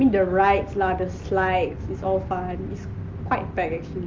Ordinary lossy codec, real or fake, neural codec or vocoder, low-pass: Opus, 24 kbps; real; none; 7.2 kHz